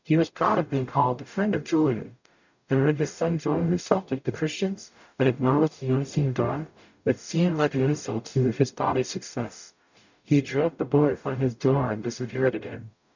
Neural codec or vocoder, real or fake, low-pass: codec, 44.1 kHz, 0.9 kbps, DAC; fake; 7.2 kHz